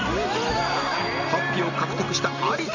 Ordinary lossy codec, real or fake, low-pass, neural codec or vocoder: none; real; 7.2 kHz; none